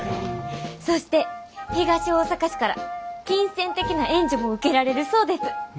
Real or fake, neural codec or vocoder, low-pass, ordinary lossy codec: real; none; none; none